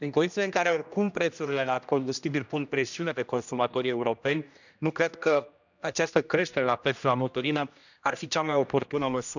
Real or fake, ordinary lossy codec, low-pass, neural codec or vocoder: fake; none; 7.2 kHz; codec, 16 kHz, 1 kbps, X-Codec, HuBERT features, trained on general audio